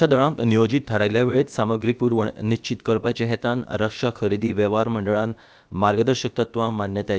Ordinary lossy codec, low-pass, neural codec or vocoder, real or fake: none; none; codec, 16 kHz, about 1 kbps, DyCAST, with the encoder's durations; fake